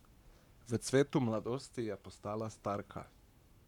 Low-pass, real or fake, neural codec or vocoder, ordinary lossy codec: 19.8 kHz; fake; codec, 44.1 kHz, 7.8 kbps, Pupu-Codec; none